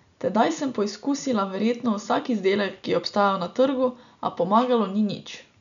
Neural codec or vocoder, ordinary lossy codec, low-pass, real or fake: none; none; 7.2 kHz; real